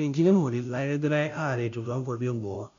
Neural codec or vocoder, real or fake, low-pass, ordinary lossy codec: codec, 16 kHz, 0.5 kbps, FunCodec, trained on Chinese and English, 25 frames a second; fake; 7.2 kHz; none